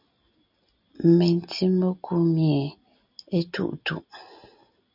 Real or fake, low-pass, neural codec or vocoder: real; 5.4 kHz; none